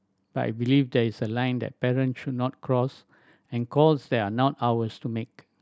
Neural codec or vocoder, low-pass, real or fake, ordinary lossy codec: none; none; real; none